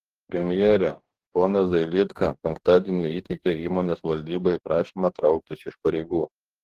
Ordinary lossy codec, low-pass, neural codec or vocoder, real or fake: Opus, 16 kbps; 14.4 kHz; codec, 44.1 kHz, 2.6 kbps, DAC; fake